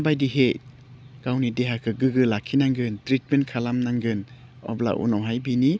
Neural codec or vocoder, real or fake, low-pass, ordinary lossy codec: none; real; none; none